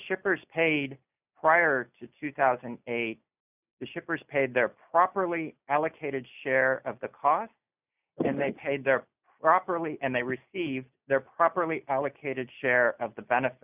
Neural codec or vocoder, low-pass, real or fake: none; 3.6 kHz; real